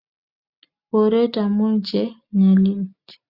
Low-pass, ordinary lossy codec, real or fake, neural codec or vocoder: 5.4 kHz; Opus, 64 kbps; real; none